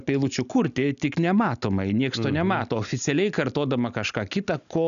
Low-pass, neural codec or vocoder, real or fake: 7.2 kHz; none; real